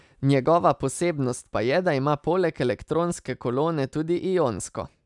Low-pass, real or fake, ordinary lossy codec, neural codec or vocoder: 10.8 kHz; real; none; none